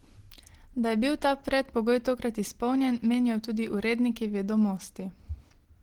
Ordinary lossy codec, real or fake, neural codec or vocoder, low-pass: Opus, 16 kbps; real; none; 19.8 kHz